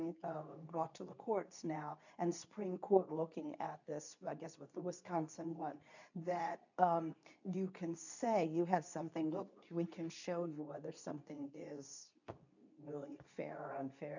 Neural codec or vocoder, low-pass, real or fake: codec, 24 kHz, 0.9 kbps, WavTokenizer, medium speech release version 2; 7.2 kHz; fake